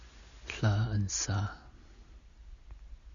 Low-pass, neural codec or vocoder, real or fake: 7.2 kHz; none; real